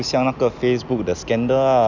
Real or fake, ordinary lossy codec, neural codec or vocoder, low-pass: real; none; none; 7.2 kHz